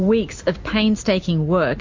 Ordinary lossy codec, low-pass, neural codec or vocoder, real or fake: MP3, 48 kbps; 7.2 kHz; none; real